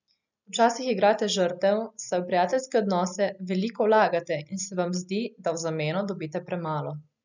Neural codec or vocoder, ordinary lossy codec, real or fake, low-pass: none; none; real; 7.2 kHz